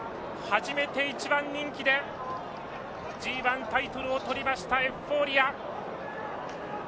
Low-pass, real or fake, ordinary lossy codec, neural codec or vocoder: none; real; none; none